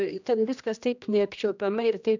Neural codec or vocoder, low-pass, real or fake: codec, 16 kHz, 1 kbps, X-Codec, HuBERT features, trained on general audio; 7.2 kHz; fake